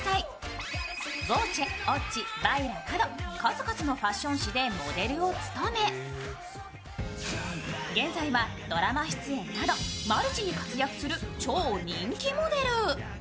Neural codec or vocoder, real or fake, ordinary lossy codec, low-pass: none; real; none; none